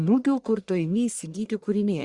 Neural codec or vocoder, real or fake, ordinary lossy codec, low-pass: codec, 44.1 kHz, 1.7 kbps, Pupu-Codec; fake; Opus, 64 kbps; 10.8 kHz